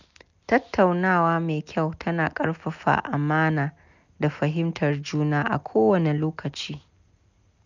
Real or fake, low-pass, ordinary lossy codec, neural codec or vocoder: real; 7.2 kHz; none; none